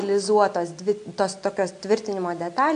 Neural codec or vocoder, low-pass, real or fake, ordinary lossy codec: none; 9.9 kHz; real; AAC, 64 kbps